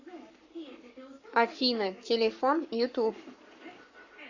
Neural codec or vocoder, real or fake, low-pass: codec, 44.1 kHz, 7.8 kbps, Pupu-Codec; fake; 7.2 kHz